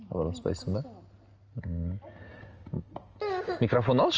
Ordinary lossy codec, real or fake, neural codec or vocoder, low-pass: Opus, 24 kbps; real; none; 7.2 kHz